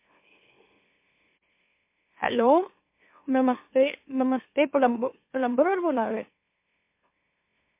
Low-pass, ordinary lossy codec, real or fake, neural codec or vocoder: 3.6 kHz; MP3, 24 kbps; fake; autoencoder, 44.1 kHz, a latent of 192 numbers a frame, MeloTTS